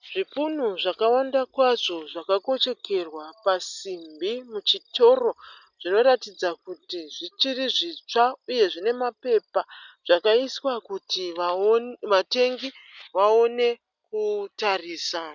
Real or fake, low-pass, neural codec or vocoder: real; 7.2 kHz; none